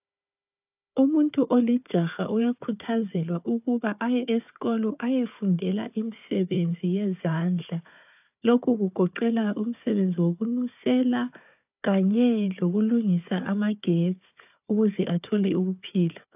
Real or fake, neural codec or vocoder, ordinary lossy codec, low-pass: fake; codec, 16 kHz, 4 kbps, FunCodec, trained on Chinese and English, 50 frames a second; AAC, 32 kbps; 3.6 kHz